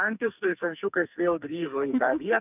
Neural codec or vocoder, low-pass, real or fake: codec, 44.1 kHz, 2.6 kbps, SNAC; 3.6 kHz; fake